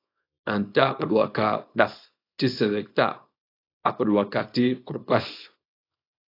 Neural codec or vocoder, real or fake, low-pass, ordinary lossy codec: codec, 24 kHz, 0.9 kbps, WavTokenizer, small release; fake; 5.4 kHz; AAC, 32 kbps